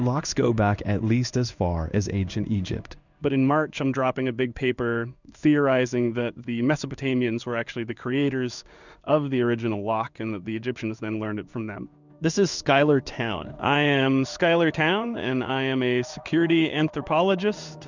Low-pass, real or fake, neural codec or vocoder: 7.2 kHz; fake; codec, 16 kHz in and 24 kHz out, 1 kbps, XY-Tokenizer